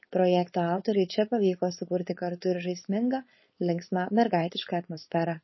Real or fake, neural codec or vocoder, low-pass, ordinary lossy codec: fake; codec, 16 kHz, 4.8 kbps, FACodec; 7.2 kHz; MP3, 24 kbps